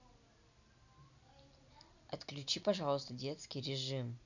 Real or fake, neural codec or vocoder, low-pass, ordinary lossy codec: real; none; 7.2 kHz; none